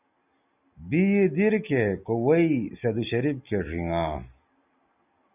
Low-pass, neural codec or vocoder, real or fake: 3.6 kHz; none; real